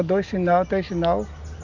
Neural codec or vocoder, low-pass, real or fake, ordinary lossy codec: none; 7.2 kHz; real; none